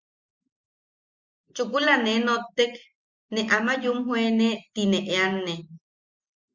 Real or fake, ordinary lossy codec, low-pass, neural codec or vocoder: real; Opus, 64 kbps; 7.2 kHz; none